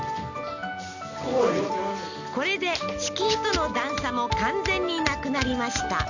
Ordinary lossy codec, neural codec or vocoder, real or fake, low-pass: none; none; real; 7.2 kHz